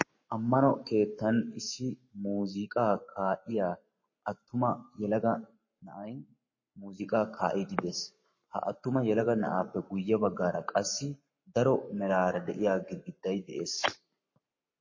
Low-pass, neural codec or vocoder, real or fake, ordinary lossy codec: 7.2 kHz; codec, 44.1 kHz, 7.8 kbps, Pupu-Codec; fake; MP3, 32 kbps